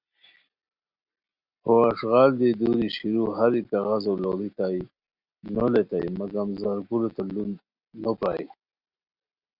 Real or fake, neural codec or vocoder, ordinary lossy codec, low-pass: real; none; Opus, 64 kbps; 5.4 kHz